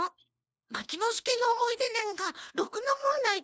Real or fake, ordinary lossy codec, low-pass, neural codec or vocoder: fake; none; none; codec, 16 kHz, 1 kbps, FunCodec, trained on LibriTTS, 50 frames a second